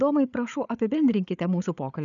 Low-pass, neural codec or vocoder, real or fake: 7.2 kHz; codec, 16 kHz, 8 kbps, FreqCodec, larger model; fake